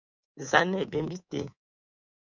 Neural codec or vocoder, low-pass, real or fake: codec, 24 kHz, 3.1 kbps, DualCodec; 7.2 kHz; fake